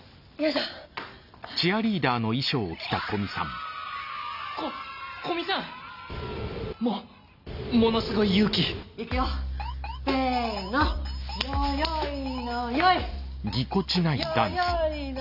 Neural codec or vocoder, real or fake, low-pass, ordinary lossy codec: none; real; 5.4 kHz; none